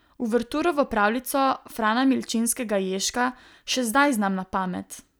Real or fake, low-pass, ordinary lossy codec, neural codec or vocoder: real; none; none; none